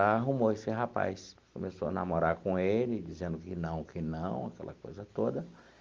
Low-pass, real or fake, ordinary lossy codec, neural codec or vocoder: 7.2 kHz; real; Opus, 32 kbps; none